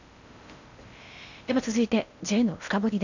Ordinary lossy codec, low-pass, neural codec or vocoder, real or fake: none; 7.2 kHz; codec, 16 kHz in and 24 kHz out, 0.8 kbps, FocalCodec, streaming, 65536 codes; fake